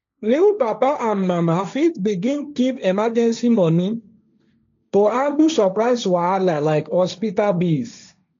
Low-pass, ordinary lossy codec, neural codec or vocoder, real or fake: 7.2 kHz; MP3, 64 kbps; codec, 16 kHz, 1.1 kbps, Voila-Tokenizer; fake